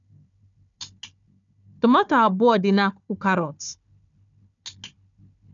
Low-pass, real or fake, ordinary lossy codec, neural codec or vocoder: 7.2 kHz; fake; none; codec, 16 kHz, 4 kbps, FunCodec, trained on Chinese and English, 50 frames a second